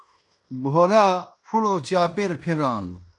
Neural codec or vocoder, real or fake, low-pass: codec, 16 kHz in and 24 kHz out, 0.9 kbps, LongCat-Audio-Codec, fine tuned four codebook decoder; fake; 10.8 kHz